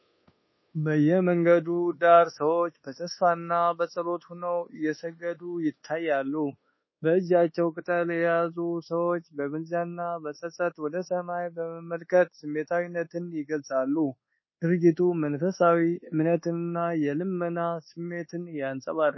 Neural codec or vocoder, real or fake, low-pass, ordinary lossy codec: codec, 24 kHz, 1.2 kbps, DualCodec; fake; 7.2 kHz; MP3, 24 kbps